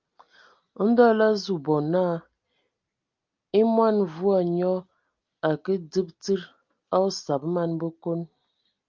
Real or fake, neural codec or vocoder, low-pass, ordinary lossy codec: real; none; 7.2 kHz; Opus, 32 kbps